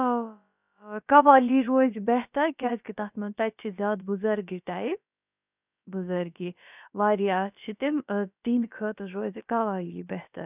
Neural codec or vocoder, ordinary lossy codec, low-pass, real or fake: codec, 16 kHz, about 1 kbps, DyCAST, with the encoder's durations; none; 3.6 kHz; fake